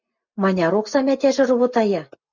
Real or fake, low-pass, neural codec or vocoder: real; 7.2 kHz; none